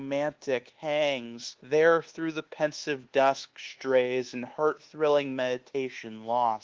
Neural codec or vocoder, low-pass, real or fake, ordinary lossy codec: codec, 24 kHz, 1.2 kbps, DualCodec; 7.2 kHz; fake; Opus, 16 kbps